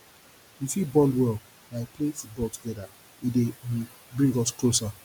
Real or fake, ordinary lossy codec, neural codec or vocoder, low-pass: real; none; none; none